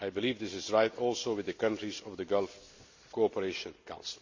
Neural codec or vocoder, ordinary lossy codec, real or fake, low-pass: none; MP3, 64 kbps; real; 7.2 kHz